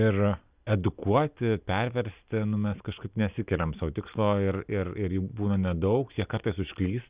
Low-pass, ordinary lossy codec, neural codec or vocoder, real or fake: 3.6 kHz; Opus, 64 kbps; none; real